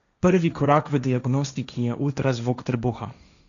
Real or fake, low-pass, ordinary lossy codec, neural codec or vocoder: fake; 7.2 kHz; none; codec, 16 kHz, 1.1 kbps, Voila-Tokenizer